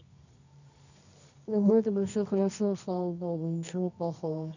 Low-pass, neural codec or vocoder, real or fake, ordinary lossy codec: 7.2 kHz; codec, 24 kHz, 0.9 kbps, WavTokenizer, medium music audio release; fake; none